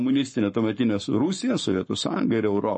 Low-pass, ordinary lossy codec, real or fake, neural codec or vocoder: 10.8 kHz; MP3, 32 kbps; fake; codec, 44.1 kHz, 7.8 kbps, Pupu-Codec